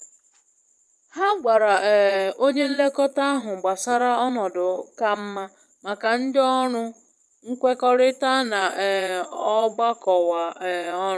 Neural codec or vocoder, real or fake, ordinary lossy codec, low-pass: vocoder, 22.05 kHz, 80 mel bands, Vocos; fake; none; none